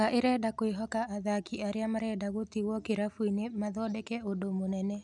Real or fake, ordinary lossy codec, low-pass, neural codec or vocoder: real; none; 10.8 kHz; none